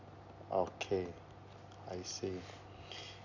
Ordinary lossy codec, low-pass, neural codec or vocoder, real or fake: none; 7.2 kHz; none; real